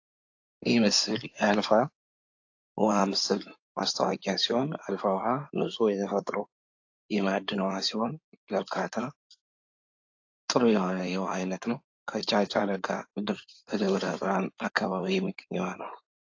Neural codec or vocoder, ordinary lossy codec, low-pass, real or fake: codec, 16 kHz in and 24 kHz out, 2.2 kbps, FireRedTTS-2 codec; AAC, 48 kbps; 7.2 kHz; fake